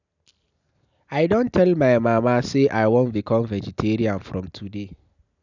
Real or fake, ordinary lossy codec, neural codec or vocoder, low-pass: real; none; none; 7.2 kHz